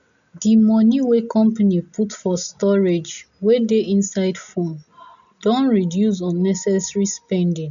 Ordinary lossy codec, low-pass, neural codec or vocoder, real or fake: none; 7.2 kHz; none; real